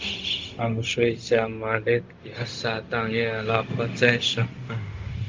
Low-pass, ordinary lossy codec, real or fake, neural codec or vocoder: 7.2 kHz; Opus, 32 kbps; fake; codec, 16 kHz, 0.4 kbps, LongCat-Audio-Codec